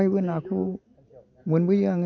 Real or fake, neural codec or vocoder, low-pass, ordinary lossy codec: real; none; 7.2 kHz; none